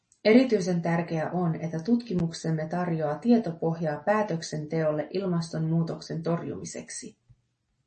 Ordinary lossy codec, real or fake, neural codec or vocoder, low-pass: MP3, 32 kbps; real; none; 10.8 kHz